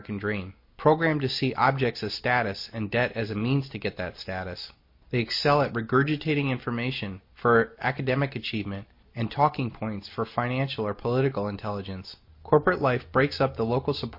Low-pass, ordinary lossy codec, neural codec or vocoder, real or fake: 5.4 kHz; MP3, 32 kbps; none; real